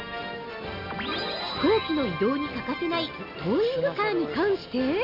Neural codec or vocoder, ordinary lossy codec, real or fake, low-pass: none; none; real; 5.4 kHz